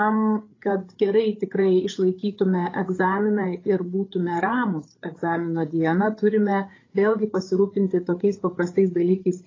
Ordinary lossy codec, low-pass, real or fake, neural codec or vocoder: AAC, 32 kbps; 7.2 kHz; fake; codec, 16 kHz, 8 kbps, FreqCodec, larger model